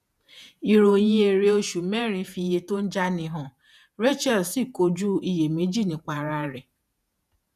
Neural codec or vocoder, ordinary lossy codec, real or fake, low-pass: vocoder, 48 kHz, 128 mel bands, Vocos; none; fake; 14.4 kHz